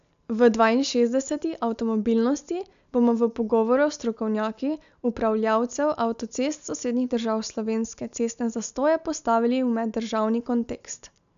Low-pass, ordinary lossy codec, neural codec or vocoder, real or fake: 7.2 kHz; none; none; real